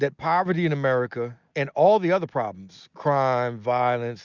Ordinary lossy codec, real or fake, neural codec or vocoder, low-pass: Opus, 64 kbps; real; none; 7.2 kHz